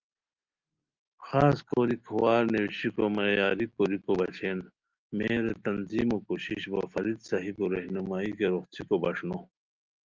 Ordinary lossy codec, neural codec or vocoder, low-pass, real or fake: Opus, 32 kbps; none; 7.2 kHz; real